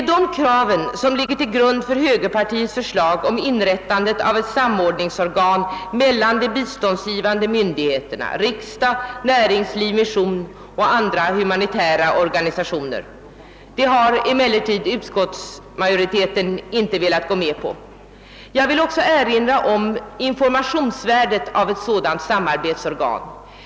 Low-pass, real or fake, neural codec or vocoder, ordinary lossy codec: none; real; none; none